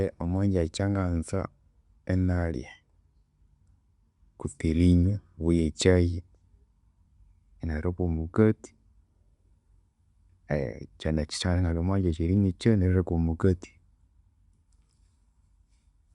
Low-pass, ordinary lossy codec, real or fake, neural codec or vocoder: 10.8 kHz; Opus, 64 kbps; real; none